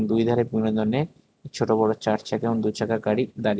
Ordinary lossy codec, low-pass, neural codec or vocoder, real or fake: none; none; none; real